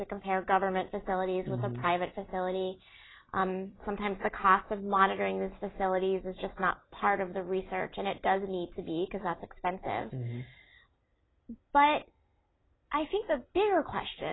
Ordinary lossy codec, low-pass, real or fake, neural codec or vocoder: AAC, 16 kbps; 7.2 kHz; real; none